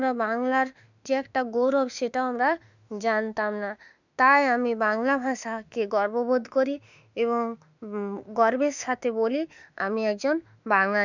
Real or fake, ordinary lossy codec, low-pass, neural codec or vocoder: fake; none; 7.2 kHz; autoencoder, 48 kHz, 32 numbers a frame, DAC-VAE, trained on Japanese speech